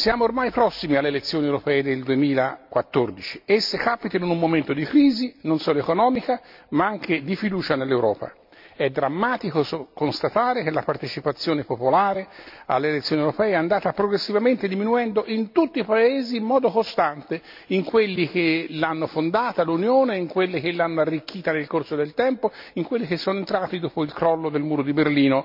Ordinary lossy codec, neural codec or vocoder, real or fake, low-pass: MP3, 48 kbps; none; real; 5.4 kHz